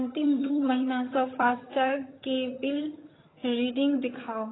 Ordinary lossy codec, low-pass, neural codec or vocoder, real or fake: AAC, 16 kbps; 7.2 kHz; vocoder, 22.05 kHz, 80 mel bands, HiFi-GAN; fake